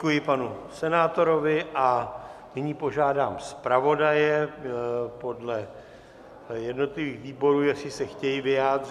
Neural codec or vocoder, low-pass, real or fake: none; 14.4 kHz; real